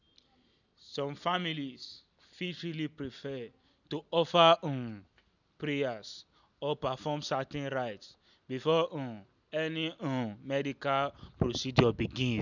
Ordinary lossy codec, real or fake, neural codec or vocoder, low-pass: none; real; none; 7.2 kHz